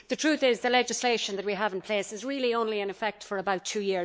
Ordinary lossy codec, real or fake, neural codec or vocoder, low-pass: none; fake; codec, 16 kHz, 4 kbps, X-Codec, WavLM features, trained on Multilingual LibriSpeech; none